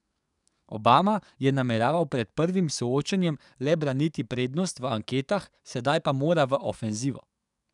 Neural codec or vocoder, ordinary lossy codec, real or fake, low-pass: codec, 44.1 kHz, 7.8 kbps, DAC; none; fake; 10.8 kHz